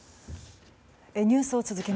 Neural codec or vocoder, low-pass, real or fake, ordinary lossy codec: none; none; real; none